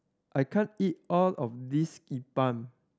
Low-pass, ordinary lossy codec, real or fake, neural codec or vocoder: none; none; real; none